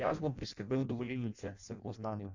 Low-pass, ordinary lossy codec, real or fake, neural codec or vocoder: 7.2 kHz; none; fake; codec, 16 kHz in and 24 kHz out, 0.6 kbps, FireRedTTS-2 codec